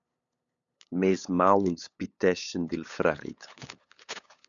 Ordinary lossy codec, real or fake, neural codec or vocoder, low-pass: MP3, 96 kbps; fake; codec, 16 kHz, 8 kbps, FunCodec, trained on LibriTTS, 25 frames a second; 7.2 kHz